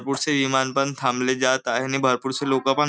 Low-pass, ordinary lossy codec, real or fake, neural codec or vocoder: none; none; real; none